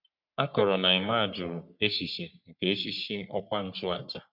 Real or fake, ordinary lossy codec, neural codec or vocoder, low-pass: fake; none; codec, 44.1 kHz, 3.4 kbps, Pupu-Codec; 5.4 kHz